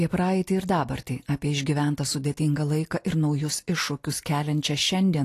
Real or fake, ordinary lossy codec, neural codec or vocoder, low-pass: real; AAC, 48 kbps; none; 14.4 kHz